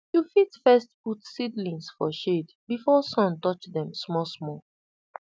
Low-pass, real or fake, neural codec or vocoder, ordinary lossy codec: none; real; none; none